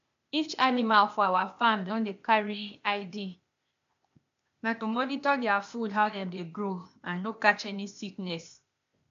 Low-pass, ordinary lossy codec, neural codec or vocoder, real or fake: 7.2 kHz; MP3, 64 kbps; codec, 16 kHz, 0.8 kbps, ZipCodec; fake